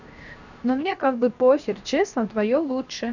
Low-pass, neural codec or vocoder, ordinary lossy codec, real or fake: 7.2 kHz; codec, 16 kHz, 0.7 kbps, FocalCodec; none; fake